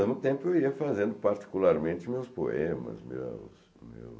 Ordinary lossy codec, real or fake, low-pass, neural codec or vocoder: none; real; none; none